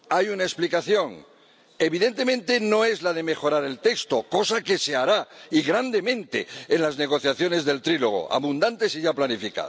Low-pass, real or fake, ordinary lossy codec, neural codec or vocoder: none; real; none; none